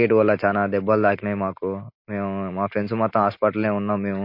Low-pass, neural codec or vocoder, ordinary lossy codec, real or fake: 5.4 kHz; none; MP3, 32 kbps; real